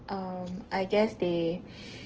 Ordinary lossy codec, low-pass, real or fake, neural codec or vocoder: Opus, 16 kbps; 7.2 kHz; real; none